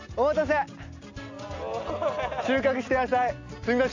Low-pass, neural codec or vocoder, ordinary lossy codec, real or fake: 7.2 kHz; none; AAC, 48 kbps; real